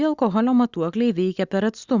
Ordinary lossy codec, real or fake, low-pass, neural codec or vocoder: Opus, 64 kbps; real; 7.2 kHz; none